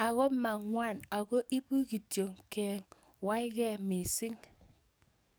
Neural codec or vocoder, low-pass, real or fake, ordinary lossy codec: codec, 44.1 kHz, 7.8 kbps, DAC; none; fake; none